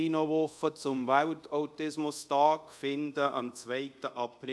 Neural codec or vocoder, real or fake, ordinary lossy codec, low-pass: codec, 24 kHz, 0.5 kbps, DualCodec; fake; none; none